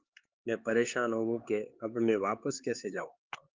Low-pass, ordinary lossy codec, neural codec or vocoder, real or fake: 7.2 kHz; Opus, 32 kbps; codec, 16 kHz, 4 kbps, X-Codec, HuBERT features, trained on LibriSpeech; fake